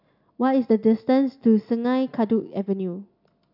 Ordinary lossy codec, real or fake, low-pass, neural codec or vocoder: none; real; 5.4 kHz; none